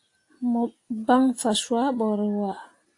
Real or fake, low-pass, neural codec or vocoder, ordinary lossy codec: real; 10.8 kHz; none; AAC, 48 kbps